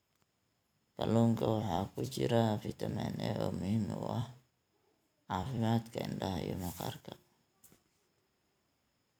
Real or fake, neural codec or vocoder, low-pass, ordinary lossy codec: fake; vocoder, 44.1 kHz, 128 mel bands every 256 samples, BigVGAN v2; none; none